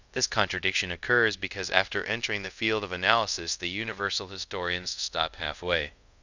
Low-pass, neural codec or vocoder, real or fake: 7.2 kHz; codec, 24 kHz, 0.5 kbps, DualCodec; fake